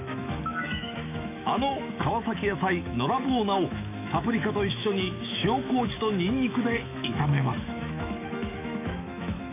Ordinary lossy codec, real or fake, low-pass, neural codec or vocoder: none; fake; 3.6 kHz; autoencoder, 48 kHz, 128 numbers a frame, DAC-VAE, trained on Japanese speech